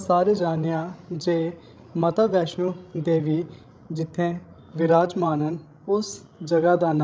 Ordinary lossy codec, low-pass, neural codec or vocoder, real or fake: none; none; codec, 16 kHz, 16 kbps, FreqCodec, larger model; fake